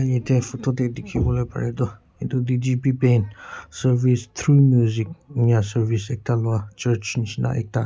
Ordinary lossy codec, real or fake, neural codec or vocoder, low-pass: none; real; none; none